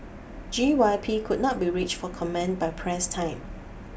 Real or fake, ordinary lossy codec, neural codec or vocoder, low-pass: real; none; none; none